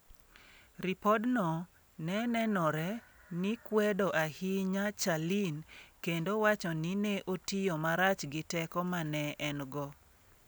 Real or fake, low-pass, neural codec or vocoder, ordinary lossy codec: real; none; none; none